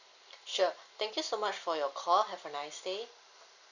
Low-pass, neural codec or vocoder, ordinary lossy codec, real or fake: 7.2 kHz; none; none; real